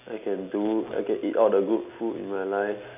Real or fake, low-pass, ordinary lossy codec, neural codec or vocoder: real; 3.6 kHz; none; none